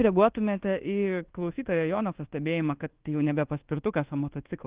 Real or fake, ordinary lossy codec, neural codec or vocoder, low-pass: fake; Opus, 16 kbps; codec, 24 kHz, 1.2 kbps, DualCodec; 3.6 kHz